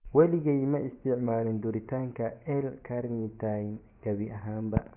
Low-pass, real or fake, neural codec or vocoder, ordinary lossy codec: 3.6 kHz; fake; vocoder, 24 kHz, 100 mel bands, Vocos; none